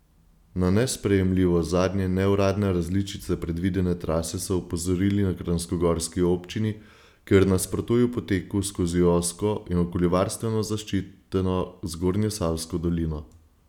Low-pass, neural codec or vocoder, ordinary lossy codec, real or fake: 19.8 kHz; none; none; real